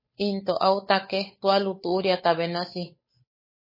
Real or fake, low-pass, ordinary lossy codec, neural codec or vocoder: fake; 5.4 kHz; MP3, 24 kbps; codec, 16 kHz, 8 kbps, FunCodec, trained on Chinese and English, 25 frames a second